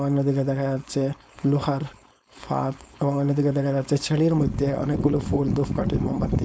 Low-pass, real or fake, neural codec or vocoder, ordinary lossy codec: none; fake; codec, 16 kHz, 4.8 kbps, FACodec; none